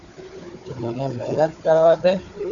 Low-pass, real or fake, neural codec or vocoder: 7.2 kHz; fake; codec, 16 kHz, 4 kbps, FunCodec, trained on Chinese and English, 50 frames a second